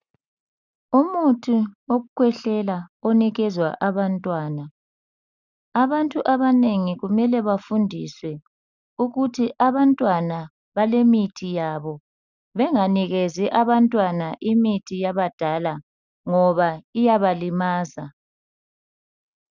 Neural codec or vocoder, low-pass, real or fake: none; 7.2 kHz; real